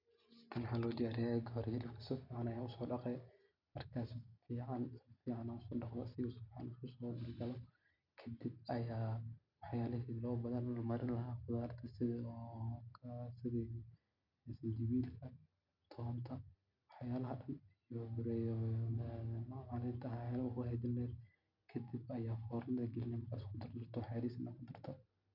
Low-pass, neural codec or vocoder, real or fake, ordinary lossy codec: 5.4 kHz; none; real; MP3, 48 kbps